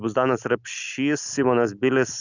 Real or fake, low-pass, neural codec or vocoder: real; 7.2 kHz; none